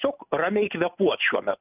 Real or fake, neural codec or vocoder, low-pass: real; none; 3.6 kHz